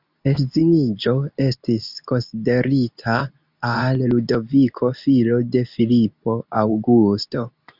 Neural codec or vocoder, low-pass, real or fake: codec, 24 kHz, 0.9 kbps, WavTokenizer, medium speech release version 2; 5.4 kHz; fake